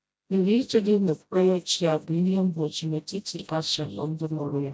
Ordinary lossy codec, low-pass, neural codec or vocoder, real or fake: none; none; codec, 16 kHz, 0.5 kbps, FreqCodec, smaller model; fake